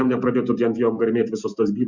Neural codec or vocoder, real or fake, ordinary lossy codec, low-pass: none; real; Opus, 64 kbps; 7.2 kHz